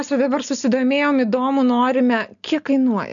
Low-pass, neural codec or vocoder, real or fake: 7.2 kHz; none; real